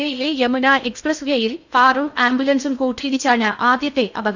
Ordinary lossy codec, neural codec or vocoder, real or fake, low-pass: none; codec, 16 kHz in and 24 kHz out, 0.6 kbps, FocalCodec, streaming, 2048 codes; fake; 7.2 kHz